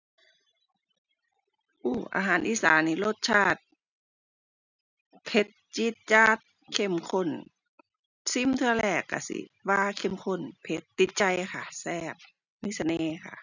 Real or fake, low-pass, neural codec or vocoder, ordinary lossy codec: real; 7.2 kHz; none; none